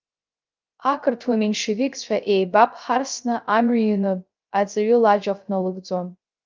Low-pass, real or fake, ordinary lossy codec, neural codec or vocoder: 7.2 kHz; fake; Opus, 24 kbps; codec, 16 kHz, 0.3 kbps, FocalCodec